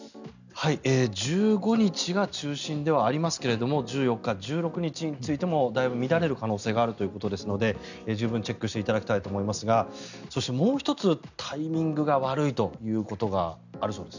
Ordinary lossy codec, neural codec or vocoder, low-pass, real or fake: none; none; 7.2 kHz; real